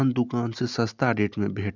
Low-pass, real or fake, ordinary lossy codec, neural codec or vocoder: 7.2 kHz; real; none; none